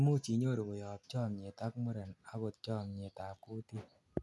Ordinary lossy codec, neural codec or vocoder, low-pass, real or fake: none; none; none; real